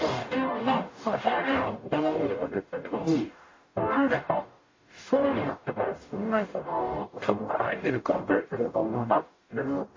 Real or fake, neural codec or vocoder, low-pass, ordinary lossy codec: fake; codec, 44.1 kHz, 0.9 kbps, DAC; 7.2 kHz; MP3, 32 kbps